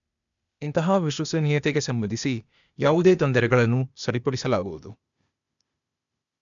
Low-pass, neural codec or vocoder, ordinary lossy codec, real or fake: 7.2 kHz; codec, 16 kHz, 0.8 kbps, ZipCodec; none; fake